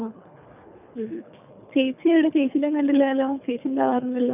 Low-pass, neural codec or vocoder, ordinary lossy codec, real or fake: 3.6 kHz; codec, 24 kHz, 3 kbps, HILCodec; none; fake